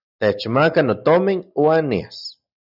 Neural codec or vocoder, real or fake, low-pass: none; real; 5.4 kHz